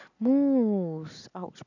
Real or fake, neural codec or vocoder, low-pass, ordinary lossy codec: real; none; 7.2 kHz; none